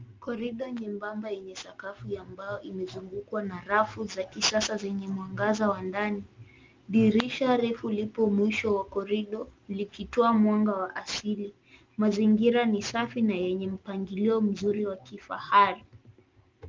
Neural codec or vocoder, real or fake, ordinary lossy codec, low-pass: none; real; Opus, 24 kbps; 7.2 kHz